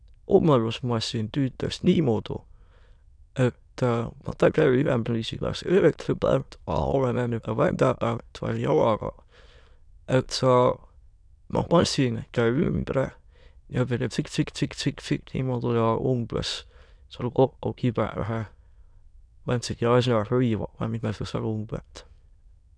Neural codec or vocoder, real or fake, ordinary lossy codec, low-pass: autoencoder, 22.05 kHz, a latent of 192 numbers a frame, VITS, trained on many speakers; fake; none; 9.9 kHz